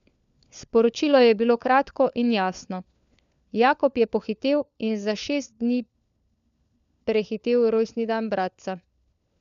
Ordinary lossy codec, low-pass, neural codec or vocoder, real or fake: MP3, 96 kbps; 7.2 kHz; codec, 16 kHz, 4 kbps, FunCodec, trained on LibriTTS, 50 frames a second; fake